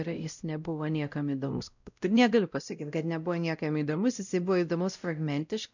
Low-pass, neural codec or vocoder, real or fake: 7.2 kHz; codec, 16 kHz, 0.5 kbps, X-Codec, WavLM features, trained on Multilingual LibriSpeech; fake